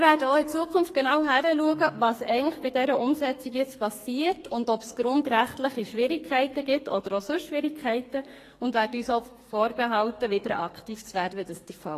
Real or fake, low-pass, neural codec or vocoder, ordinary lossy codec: fake; 14.4 kHz; codec, 44.1 kHz, 2.6 kbps, SNAC; AAC, 48 kbps